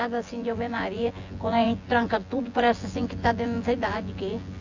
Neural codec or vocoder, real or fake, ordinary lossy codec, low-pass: vocoder, 24 kHz, 100 mel bands, Vocos; fake; AAC, 48 kbps; 7.2 kHz